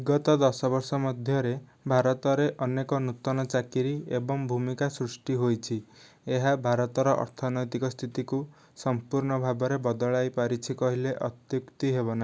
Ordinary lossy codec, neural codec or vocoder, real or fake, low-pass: none; none; real; none